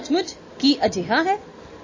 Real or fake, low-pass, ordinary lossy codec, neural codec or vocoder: real; 7.2 kHz; MP3, 32 kbps; none